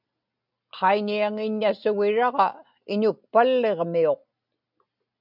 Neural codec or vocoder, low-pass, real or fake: none; 5.4 kHz; real